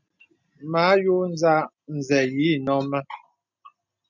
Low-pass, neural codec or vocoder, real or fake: 7.2 kHz; none; real